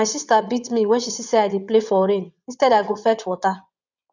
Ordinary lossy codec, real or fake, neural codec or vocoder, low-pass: none; fake; vocoder, 22.05 kHz, 80 mel bands, WaveNeXt; 7.2 kHz